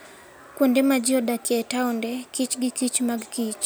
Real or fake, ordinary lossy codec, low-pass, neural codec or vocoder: real; none; none; none